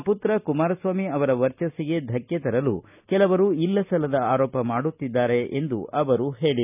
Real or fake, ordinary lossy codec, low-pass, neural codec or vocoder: real; none; 3.6 kHz; none